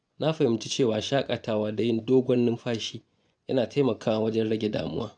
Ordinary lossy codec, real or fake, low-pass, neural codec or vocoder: none; fake; 9.9 kHz; vocoder, 24 kHz, 100 mel bands, Vocos